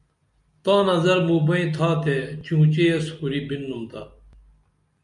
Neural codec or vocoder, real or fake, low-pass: none; real; 10.8 kHz